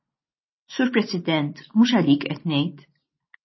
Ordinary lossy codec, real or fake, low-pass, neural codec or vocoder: MP3, 24 kbps; real; 7.2 kHz; none